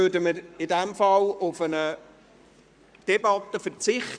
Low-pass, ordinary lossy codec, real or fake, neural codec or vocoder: 9.9 kHz; none; fake; codec, 44.1 kHz, 7.8 kbps, Pupu-Codec